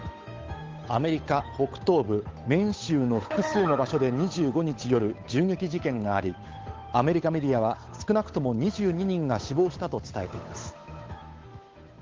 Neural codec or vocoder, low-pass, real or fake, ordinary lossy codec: codec, 16 kHz, 8 kbps, FunCodec, trained on Chinese and English, 25 frames a second; 7.2 kHz; fake; Opus, 32 kbps